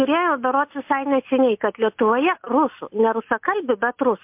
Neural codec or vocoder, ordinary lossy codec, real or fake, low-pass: none; MP3, 32 kbps; real; 3.6 kHz